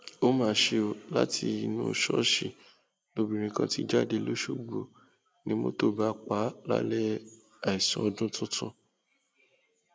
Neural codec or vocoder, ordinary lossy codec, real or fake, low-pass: none; none; real; none